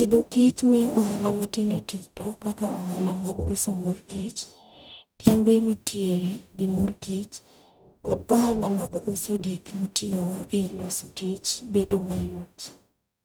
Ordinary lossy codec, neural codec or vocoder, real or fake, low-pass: none; codec, 44.1 kHz, 0.9 kbps, DAC; fake; none